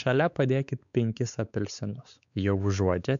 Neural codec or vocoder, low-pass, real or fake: codec, 16 kHz, 4 kbps, X-Codec, WavLM features, trained on Multilingual LibriSpeech; 7.2 kHz; fake